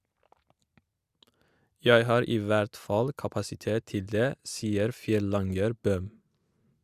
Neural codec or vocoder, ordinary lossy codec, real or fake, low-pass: none; Opus, 64 kbps; real; 14.4 kHz